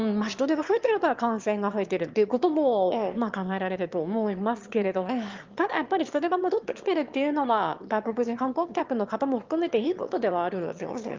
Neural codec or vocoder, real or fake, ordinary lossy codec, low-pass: autoencoder, 22.05 kHz, a latent of 192 numbers a frame, VITS, trained on one speaker; fake; Opus, 32 kbps; 7.2 kHz